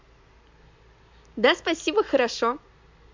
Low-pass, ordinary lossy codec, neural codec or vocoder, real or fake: 7.2 kHz; MP3, 48 kbps; none; real